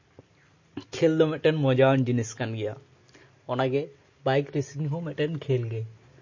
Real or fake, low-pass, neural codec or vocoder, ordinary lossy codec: real; 7.2 kHz; none; MP3, 32 kbps